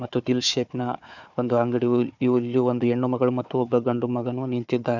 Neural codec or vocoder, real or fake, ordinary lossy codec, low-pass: codec, 16 kHz, 4 kbps, FunCodec, trained on Chinese and English, 50 frames a second; fake; none; 7.2 kHz